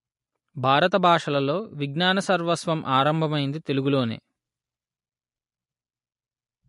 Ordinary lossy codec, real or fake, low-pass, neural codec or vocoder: MP3, 48 kbps; real; 14.4 kHz; none